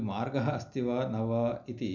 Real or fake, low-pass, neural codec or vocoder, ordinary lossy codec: real; 7.2 kHz; none; none